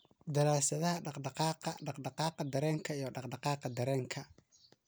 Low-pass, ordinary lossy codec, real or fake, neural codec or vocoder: none; none; real; none